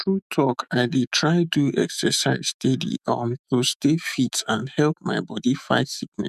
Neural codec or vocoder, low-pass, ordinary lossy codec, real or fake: autoencoder, 48 kHz, 128 numbers a frame, DAC-VAE, trained on Japanese speech; 14.4 kHz; none; fake